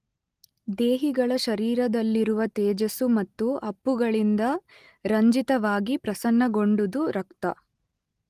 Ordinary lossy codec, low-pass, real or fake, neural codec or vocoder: Opus, 24 kbps; 14.4 kHz; real; none